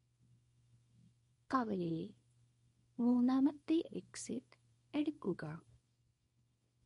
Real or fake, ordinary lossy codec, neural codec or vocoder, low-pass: fake; MP3, 48 kbps; codec, 24 kHz, 0.9 kbps, WavTokenizer, small release; 10.8 kHz